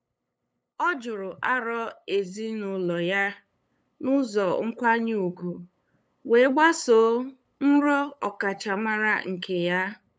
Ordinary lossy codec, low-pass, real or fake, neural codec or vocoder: none; none; fake; codec, 16 kHz, 8 kbps, FunCodec, trained on LibriTTS, 25 frames a second